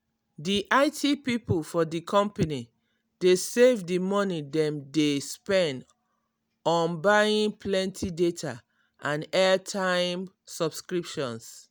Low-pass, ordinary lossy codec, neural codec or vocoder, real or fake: none; none; none; real